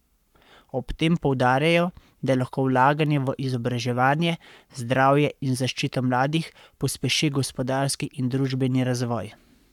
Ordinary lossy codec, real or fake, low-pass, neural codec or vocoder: none; fake; 19.8 kHz; codec, 44.1 kHz, 7.8 kbps, Pupu-Codec